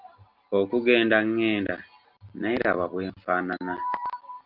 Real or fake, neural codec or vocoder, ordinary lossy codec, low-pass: real; none; Opus, 24 kbps; 5.4 kHz